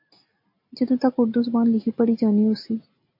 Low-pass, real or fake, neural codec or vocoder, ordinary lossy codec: 5.4 kHz; real; none; MP3, 32 kbps